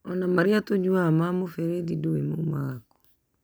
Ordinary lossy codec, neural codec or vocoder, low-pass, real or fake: none; none; none; real